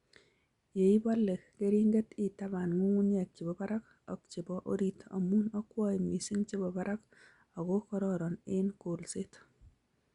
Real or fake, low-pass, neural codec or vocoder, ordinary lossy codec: real; 10.8 kHz; none; Opus, 64 kbps